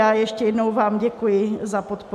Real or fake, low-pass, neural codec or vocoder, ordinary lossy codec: real; 14.4 kHz; none; AAC, 96 kbps